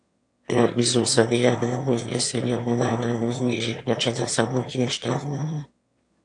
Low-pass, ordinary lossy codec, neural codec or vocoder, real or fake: 9.9 kHz; AAC, 64 kbps; autoencoder, 22.05 kHz, a latent of 192 numbers a frame, VITS, trained on one speaker; fake